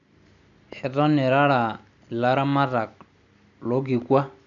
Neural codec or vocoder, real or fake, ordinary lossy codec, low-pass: none; real; none; 7.2 kHz